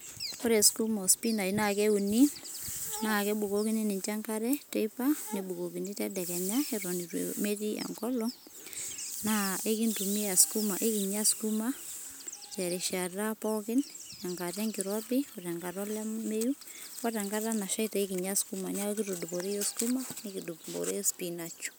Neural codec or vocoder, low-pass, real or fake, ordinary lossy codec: none; none; real; none